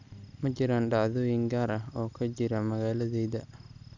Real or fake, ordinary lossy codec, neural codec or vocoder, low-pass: real; none; none; 7.2 kHz